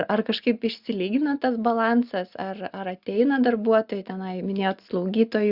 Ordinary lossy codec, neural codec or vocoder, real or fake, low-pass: Opus, 64 kbps; none; real; 5.4 kHz